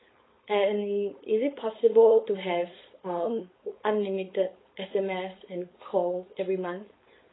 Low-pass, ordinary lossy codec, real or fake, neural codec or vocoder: 7.2 kHz; AAC, 16 kbps; fake; codec, 16 kHz, 4.8 kbps, FACodec